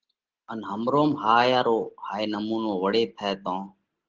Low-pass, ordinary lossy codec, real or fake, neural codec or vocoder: 7.2 kHz; Opus, 16 kbps; real; none